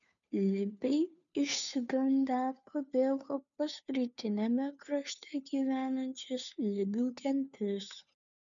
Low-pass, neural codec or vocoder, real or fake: 7.2 kHz; codec, 16 kHz, 2 kbps, FunCodec, trained on Chinese and English, 25 frames a second; fake